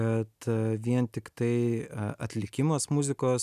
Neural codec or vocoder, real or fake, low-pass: none; real; 14.4 kHz